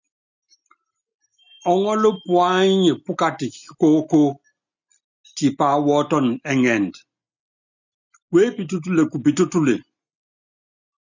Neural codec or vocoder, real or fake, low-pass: none; real; 7.2 kHz